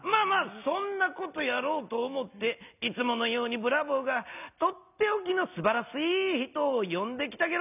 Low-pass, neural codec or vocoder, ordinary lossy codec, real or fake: 3.6 kHz; none; none; real